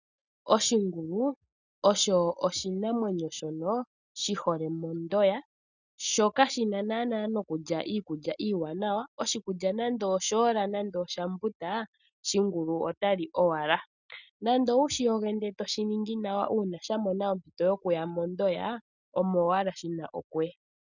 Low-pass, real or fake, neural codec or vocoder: 7.2 kHz; real; none